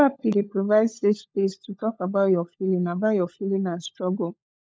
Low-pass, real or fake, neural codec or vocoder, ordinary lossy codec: none; fake; codec, 16 kHz, 16 kbps, FunCodec, trained on LibriTTS, 50 frames a second; none